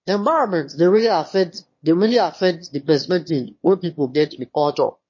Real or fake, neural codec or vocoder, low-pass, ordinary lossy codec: fake; autoencoder, 22.05 kHz, a latent of 192 numbers a frame, VITS, trained on one speaker; 7.2 kHz; MP3, 32 kbps